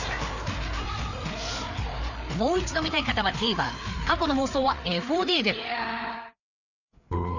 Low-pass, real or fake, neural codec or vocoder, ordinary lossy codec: 7.2 kHz; fake; codec, 16 kHz, 4 kbps, FreqCodec, larger model; none